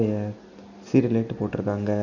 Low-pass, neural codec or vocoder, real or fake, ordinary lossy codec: 7.2 kHz; none; real; none